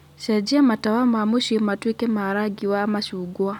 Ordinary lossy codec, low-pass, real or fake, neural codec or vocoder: none; 19.8 kHz; real; none